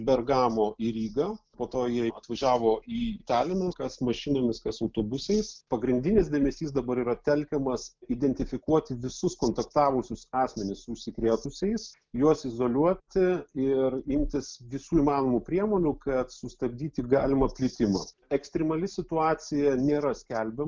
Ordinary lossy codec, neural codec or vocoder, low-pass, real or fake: Opus, 24 kbps; none; 7.2 kHz; real